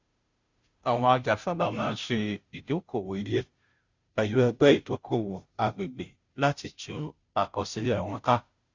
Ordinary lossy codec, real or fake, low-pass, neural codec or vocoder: Opus, 64 kbps; fake; 7.2 kHz; codec, 16 kHz, 0.5 kbps, FunCodec, trained on Chinese and English, 25 frames a second